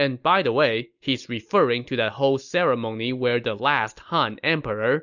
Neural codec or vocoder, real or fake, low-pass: none; real; 7.2 kHz